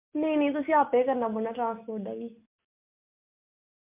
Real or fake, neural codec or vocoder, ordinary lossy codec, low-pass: real; none; MP3, 32 kbps; 3.6 kHz